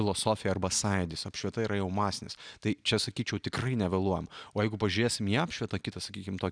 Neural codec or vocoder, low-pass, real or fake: none; 9.9 kHz; real